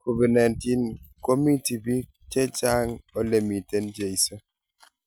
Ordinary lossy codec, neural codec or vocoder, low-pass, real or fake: none; none; none; real